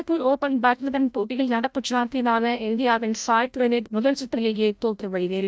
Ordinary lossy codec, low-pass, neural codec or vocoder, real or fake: none; none; codec, 16 kHz, 0.5 kbps, FreqCodec, larger model; fake